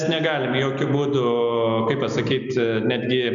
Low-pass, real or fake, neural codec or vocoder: 7.2 kHz; real; none